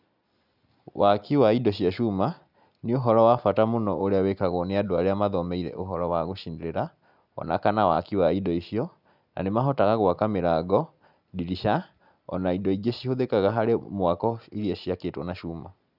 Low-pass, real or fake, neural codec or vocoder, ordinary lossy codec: 5.4 kHz; real; none; none